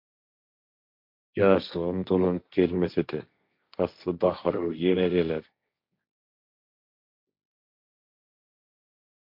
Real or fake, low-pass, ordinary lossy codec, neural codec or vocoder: fake; 5.4 kHz; MP3, 48 kbps; codec, 16 kHz, 1.1 kbps, Voila-Tokenizer